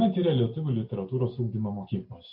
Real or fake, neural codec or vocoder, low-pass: real; none; 5.4 kHz